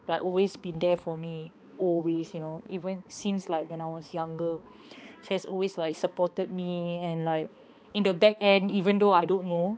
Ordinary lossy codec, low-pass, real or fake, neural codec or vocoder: none; none; fake; codec, 16 kHz, 2 kbps, X-Codec, HuBERT features, trained on balanced general audio